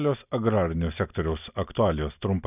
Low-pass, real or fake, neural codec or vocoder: 3.6 kHz; real; none